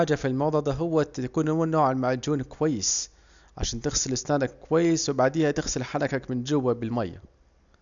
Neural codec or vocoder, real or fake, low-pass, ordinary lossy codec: none; real; 7.2 kHz; none